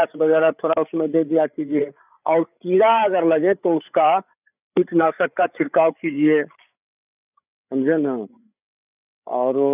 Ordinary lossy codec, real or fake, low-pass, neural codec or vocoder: none; fake; 3.6 kHz; codec, 16 kHz, 8 kbps, FreqCodec, larger model